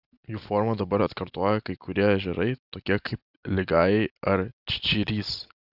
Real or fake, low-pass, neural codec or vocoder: real; 5.4 kHz; none